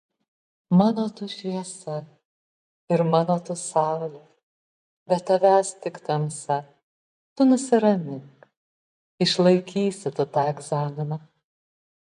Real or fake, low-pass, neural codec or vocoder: fake; 10.8 kHz; vocoder, 24 kHz, 100 mel bands, Vocos